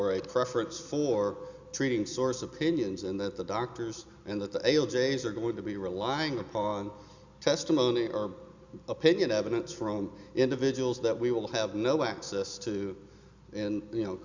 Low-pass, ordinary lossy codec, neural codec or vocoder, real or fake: 7.2 kHz; Opus, 64 kbps; none; real